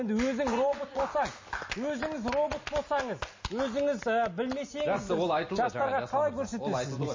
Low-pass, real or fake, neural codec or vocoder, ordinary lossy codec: 7.2 kHz; real; none; MP3, 32 kbps